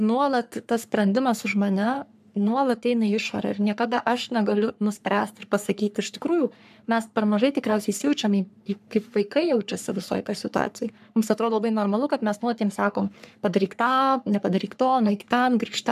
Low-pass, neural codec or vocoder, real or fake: 14.4 kHz; codec, 44.1 kHz, 3.4 kbps, Pupu-Codec; fake